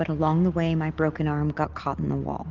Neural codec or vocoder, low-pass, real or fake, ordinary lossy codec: none; 7.2 kHz; real; Opus, 32 kbps